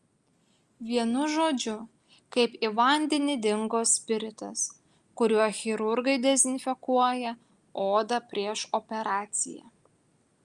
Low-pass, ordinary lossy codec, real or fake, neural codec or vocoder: 10.8 kHz; Opus, 32 kbps; real; none